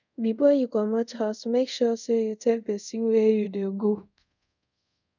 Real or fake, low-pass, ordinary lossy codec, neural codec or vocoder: fake; 7.2 kHz; none; codec, 24 kHz, 0.5 kbps, DualCodec